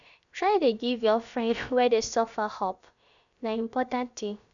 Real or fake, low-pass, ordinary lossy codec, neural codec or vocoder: fake; 7.2 kHz; none; codec, 16 kHz, about 1 kbps, DyCAST, with the encoder's durations